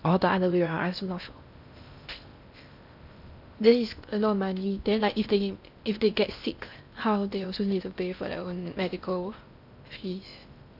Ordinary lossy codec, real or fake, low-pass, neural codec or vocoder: none; fake; 5.4 kHz; codec, 16 kHz in and 24 kHz out, 0.6 kbps, FocalCodec, streaming, 2048 codes